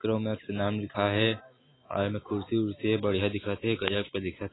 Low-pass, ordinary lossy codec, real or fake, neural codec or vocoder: 7.2 kHz; AAC, 16 kbps; real; none